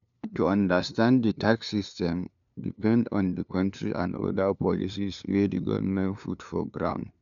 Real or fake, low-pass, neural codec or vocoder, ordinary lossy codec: fake; 7.2 kHz; codec, 16 kHz, 2 kbps, FunCodec, trained on LibriTTS, 25 frames a second; none